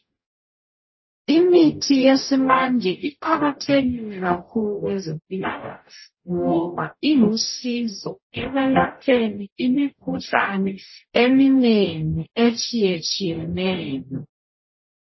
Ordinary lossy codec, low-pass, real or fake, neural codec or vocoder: MP3, 24 kbps; 7.2 kHz; fake; codec, 44.1 kHz, 0.9 kbps, DAC